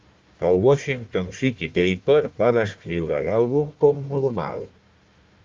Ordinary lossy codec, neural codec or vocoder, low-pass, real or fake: Opus, 24 kbps; codec, 16 kHz, 1 kbps, FunCodec, trained on Chinese and English, 50 frames a second; 7.2 kHz; fake